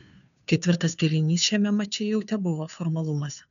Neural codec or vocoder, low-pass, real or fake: codec, 16 kHz, 2 kbps, FunCodec, trained on Chinese and English, 25 frames a second; 7.2 kHz; fake